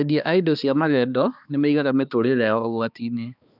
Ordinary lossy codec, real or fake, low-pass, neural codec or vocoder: none; fake; 5.4 kHz; codec, 16 kHz, 2 kbps, X-Codec, HuBERT features, trained on balanced general audio